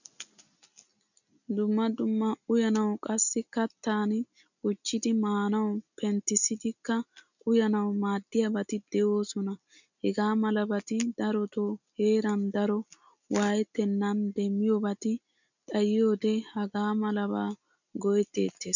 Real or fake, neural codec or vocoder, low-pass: real; none; 7.2 kHz